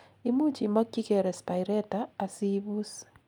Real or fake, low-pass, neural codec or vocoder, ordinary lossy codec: real; 19.8 kHz; none; none